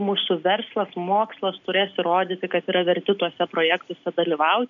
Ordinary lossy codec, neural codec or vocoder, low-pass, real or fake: MP3, 96 kbps; none; 7.2 kHz; real